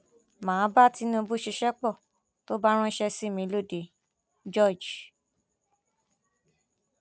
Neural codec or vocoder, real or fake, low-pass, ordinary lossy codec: none; real; none; none